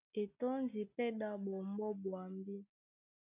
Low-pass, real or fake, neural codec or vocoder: 3.6 kHz; real; none